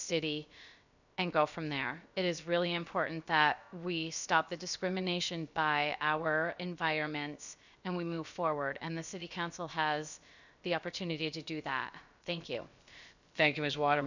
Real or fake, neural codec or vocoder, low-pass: fake; codec, 16 kHz, about 1 kbps, DyCAST, with the encoder's durations; 7.2 kHz